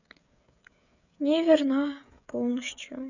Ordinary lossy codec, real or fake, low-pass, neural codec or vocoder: none; fake; 7.2 kHz; codec, 16 kHz, 16 kbps, FreqCodec, smaller model